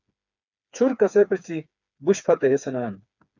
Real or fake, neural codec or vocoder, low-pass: fake; codec, 16 kHz, 4 kbps, FreqCodec, smaller model; 7.2 kHz